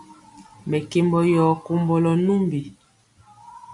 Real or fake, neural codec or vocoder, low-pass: real; none; 10.8 kHz